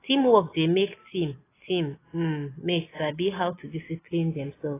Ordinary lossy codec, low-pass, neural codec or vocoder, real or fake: AAC, 16 kbps; 3.6 kHz; none; real